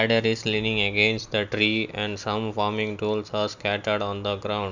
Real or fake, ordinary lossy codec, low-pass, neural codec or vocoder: real; Opus, 64 kbps; 7.2 kHz; none